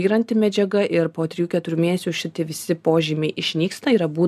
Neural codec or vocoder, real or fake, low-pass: none; real; 14.4 kHz